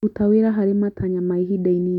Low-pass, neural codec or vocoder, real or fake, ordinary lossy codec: 19.8 kHz; none; real; MP3, 96 kbps